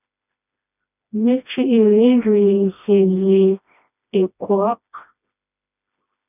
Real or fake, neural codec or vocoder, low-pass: fake; codec, 16 kHz, 1 kbps, FreqCodec, smaller model; 3.6 kHz